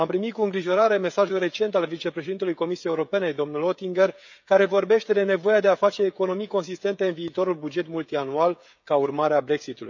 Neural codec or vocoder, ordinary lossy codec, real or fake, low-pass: codec, 16 kHz, 16 kbps, FreqCodec, smaller model; none; fake; 7.2 kHz